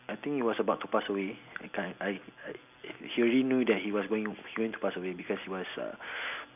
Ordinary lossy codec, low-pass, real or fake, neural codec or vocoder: none; 3.6 kHz; real; none